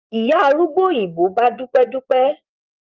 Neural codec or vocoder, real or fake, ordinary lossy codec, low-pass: vocoder, 24 kHz, 100 mel bands, Vocos; fake; Opus, 32 kbps; 7.2 kHz